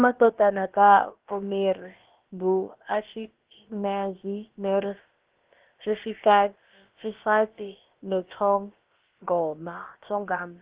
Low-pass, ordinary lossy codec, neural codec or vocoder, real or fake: 3.6 kHz; Opus, 16 kbps; codec, 16 kHz, about 1 kbps, DyCAST, with the encoder's durations; fake